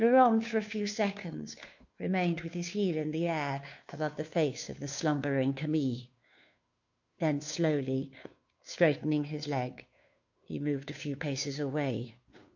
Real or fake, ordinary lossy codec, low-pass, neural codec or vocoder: fake; MP3, 48 kbps; 7.2 kHz; codec, 16 kHz, 2 kbps, FunCodec, trained on Chinese and English, 25 frames a second